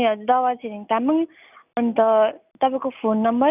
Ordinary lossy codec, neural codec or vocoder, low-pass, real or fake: none; none; 3.6 kHz; real